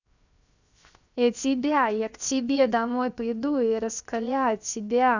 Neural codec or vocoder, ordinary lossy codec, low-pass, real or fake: codec, 16 kHz, 0.7 kbps, FocalCodec; none; 7.2 kHz; fake